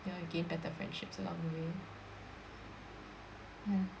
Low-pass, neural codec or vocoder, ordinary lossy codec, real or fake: none; none; none; real